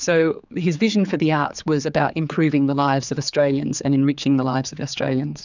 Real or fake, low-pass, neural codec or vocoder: fake; 7.2 kHz; codec, 16 kHz, 4 kbps, X-Codec, HuBERT features, trained on general audio